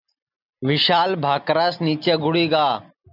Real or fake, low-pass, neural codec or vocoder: real; 5.4 kHz; none